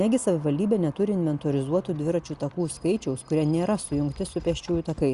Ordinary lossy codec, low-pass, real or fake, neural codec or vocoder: Opus, 64 kbps; 10.8 kHz; real; none